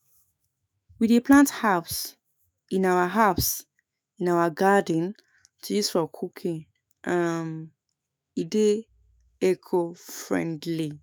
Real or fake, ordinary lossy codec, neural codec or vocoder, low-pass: fake; none; autoencoder, 48 kHz, 128 numbers a frame, DAC-VAE, trained on Japanese speech; none